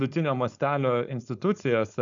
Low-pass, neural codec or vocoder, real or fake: 7.2 kHz; codec, 16 kHz, 4.8 kbps, FACodec; fake